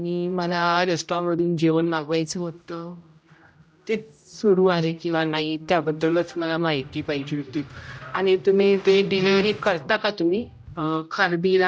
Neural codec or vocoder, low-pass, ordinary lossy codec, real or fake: codec, 16 kHz, 0.5 kbps, X-Codec, HuBERT features, trained on general audio; none; none; fake